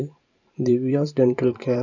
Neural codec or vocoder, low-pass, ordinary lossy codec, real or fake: vocoder, 44.1 kHz, 128 mel bands every 512 samples, BigVGAN v2; 7.2 kHz; none; fake